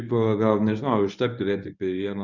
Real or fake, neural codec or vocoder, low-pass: fake; codec, 24 kHz, 0.9 kbps, WavTokenizer, medium speech release version 1; 7.2 kHz